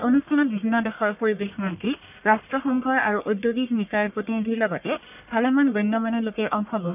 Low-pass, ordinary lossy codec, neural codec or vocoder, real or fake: 3.6 kHz; none; codec, 44.1 kHz, 1.7 kbps, Pupu-Codec; fake